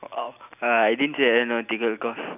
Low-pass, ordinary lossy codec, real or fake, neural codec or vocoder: 3.6 kHz; none; real; none